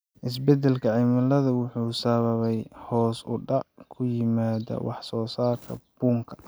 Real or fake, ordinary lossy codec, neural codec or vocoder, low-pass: real; none; none; none